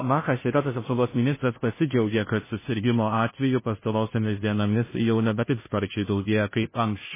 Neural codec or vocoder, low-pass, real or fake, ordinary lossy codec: codec, 16 kHz, 0.5 kbps, FunCodec, trained on Chinese and English, 25 frames a second; 3.6 kHz; fake; MP3, 16 kbps